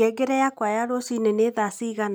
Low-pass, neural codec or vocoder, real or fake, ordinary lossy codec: none; none; real; none